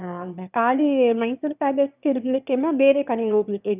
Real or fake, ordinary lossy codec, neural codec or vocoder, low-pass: fake; none; autoencoder, 22.05 kHz, a latent of 192 numbers a frame, VITS, trained on one speaker; 3.6 kHz